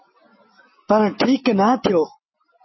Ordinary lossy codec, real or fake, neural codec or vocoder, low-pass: MP3, 24 kbps; real; none; 7.2 kHz